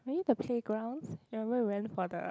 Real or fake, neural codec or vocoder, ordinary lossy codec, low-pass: real; none; none; none